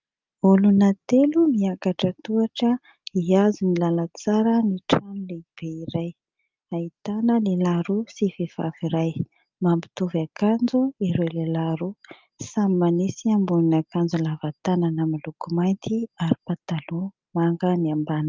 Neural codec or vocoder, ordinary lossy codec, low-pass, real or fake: none; Opus, 24 kbps; 7.2 kHz; real